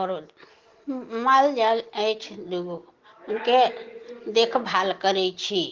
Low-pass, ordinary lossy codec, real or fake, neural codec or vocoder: 7.2 kHz; Opus, 16 kbps; real; none